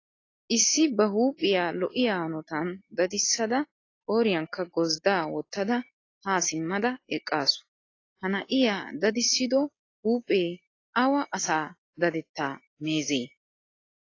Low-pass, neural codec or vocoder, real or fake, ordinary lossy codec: 7.2 kHz; none; real; AAC, 32 kbps